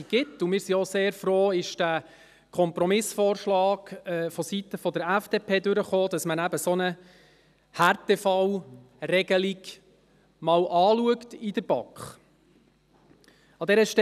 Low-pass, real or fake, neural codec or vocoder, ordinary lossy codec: 14.4 kHz; real; none; none